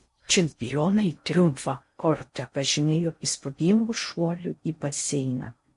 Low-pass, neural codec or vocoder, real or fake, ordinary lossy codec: 10.8 kHz; codec, 16 kHz in and 24 kHz out, 0.6 kbps, FocalCodec, streaming, 4096 codes; fake; MP3, 48 kbps